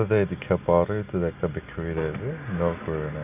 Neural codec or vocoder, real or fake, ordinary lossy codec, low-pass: none; real; none; 3.6 kHz